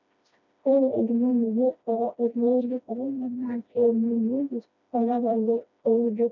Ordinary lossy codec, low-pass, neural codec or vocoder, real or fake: none; 7.2 kHz; codec, 16 kHz, 1 kbps, FreqCodec, smaller model; fake